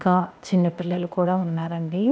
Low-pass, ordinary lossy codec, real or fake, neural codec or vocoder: none; none; fake; codec, 16 kHz, 0.8 kbps, ZipCodec